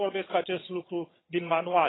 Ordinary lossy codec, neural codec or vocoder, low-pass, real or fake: AAC, 16 kbps; vocoder, 22.05 kHz, 80 mel bands, HiFi-GAN; 7.2 kHz; fake